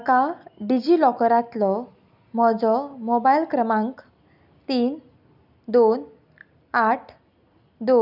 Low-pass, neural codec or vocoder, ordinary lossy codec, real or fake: 5.4 kHz; none; none; real